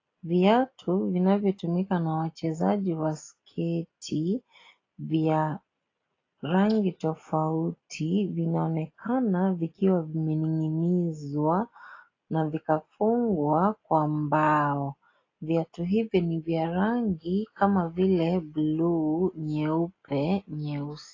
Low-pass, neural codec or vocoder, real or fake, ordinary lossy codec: 7.2 kHz; none; real; AAC, 32 kbps